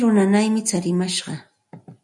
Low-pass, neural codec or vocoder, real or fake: 10.8 kHz; none; real